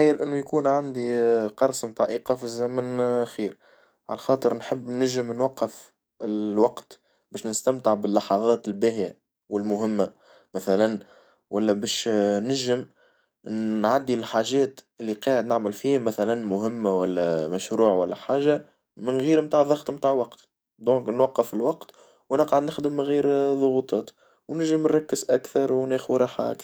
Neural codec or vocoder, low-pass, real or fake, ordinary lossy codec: codec, 44.1 kHz, 7.8 kbps, DAC; none; fake; none